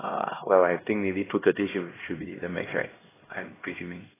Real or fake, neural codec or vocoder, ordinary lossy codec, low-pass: fake; codec, 16 kHz, 1 kbps, X-Codec, HuBERT features, trained on LibriSpeech; AAC, 16 kbps; 3.6 kHz